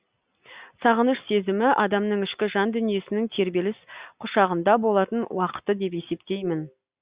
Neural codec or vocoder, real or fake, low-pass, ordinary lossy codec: none; real; 3.6 kHz; Opus, 64 kbps